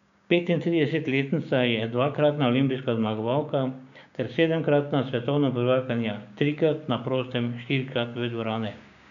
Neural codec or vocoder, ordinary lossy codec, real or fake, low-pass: codec, 16 kHz, 6 kbps, DAC; none; fake; 7.2 kHz